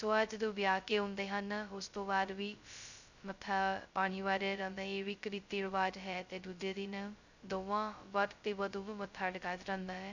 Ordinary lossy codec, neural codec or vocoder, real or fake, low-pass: none; codec, 16 kHz, 0.2 kbps, FocalCodec; fake; 7.2 kHz